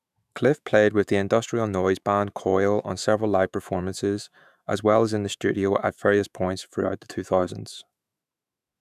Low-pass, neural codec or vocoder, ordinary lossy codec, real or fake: 14.4 kHz; autoencoder, 48 kHz, 128 numbers a frame, DAC-VAE, trained on Japanese speech; none; fake